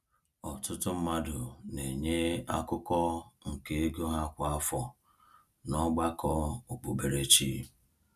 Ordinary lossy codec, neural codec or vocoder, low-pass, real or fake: none; none; 14.4 kHz; real